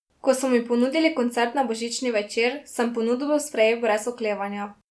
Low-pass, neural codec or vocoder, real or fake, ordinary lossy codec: none; none; real; none